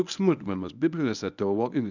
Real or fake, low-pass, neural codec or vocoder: fake; 7.2 kHz; codec, 24 kHz, 0.9 kbps, WavTokenizer, medium speech release version 1